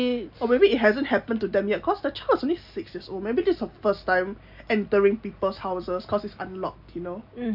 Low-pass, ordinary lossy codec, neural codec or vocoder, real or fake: 5.4 kHz; AAC, 48 kbps; none; real